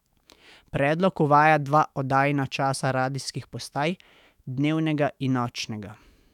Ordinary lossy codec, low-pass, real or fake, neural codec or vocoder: none; 19.8 kHz; fake; autoencoder, 48 kHz, 128 numbers a frame, DAC-VAE, trained on Japanese speech